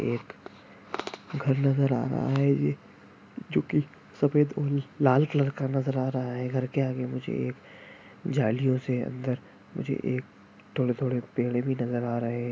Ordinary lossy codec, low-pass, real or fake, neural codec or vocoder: none; none; real; none